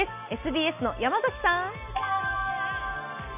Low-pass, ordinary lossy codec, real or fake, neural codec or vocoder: 3.6 kHz; none; real; none